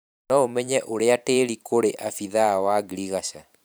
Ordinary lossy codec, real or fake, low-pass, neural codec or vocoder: none; fake; none; vocoder, 44.1 kHz, 128 mel bands every 512 samples, BigVGAN v2